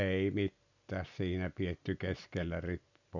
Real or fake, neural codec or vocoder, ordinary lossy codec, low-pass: real; none; none; 7.2 kHz